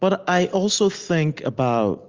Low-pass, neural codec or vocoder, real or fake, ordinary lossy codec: 7.2 kHz; none; real; Opus, 32 kbps